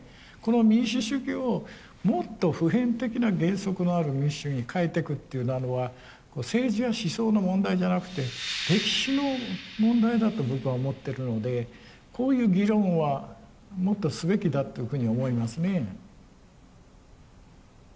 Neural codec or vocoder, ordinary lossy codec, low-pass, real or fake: none; none; none; real